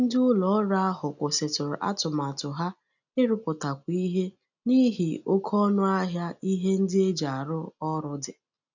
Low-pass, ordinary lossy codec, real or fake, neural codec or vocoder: 7.2 kHz; none; real; none